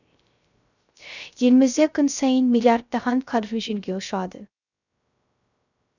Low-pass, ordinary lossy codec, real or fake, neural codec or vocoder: 7.2 kHz; none; fake; codec, 16 kHz, 0.3 kbps, FocalCodec